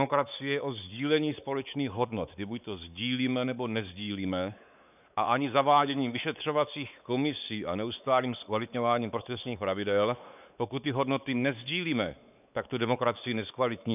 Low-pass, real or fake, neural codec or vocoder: 3.6 kHz; fake; codec, 16 kHz, 4 kbps, X-Codec, WavLM features, trained on Multilingual LibriSpeech